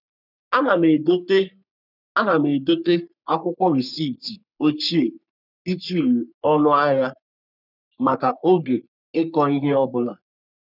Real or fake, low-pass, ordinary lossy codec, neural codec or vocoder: fake; 5.4 kHz; none; codec, 44.1 kHz, 3.4 kbps, Pupu-Codec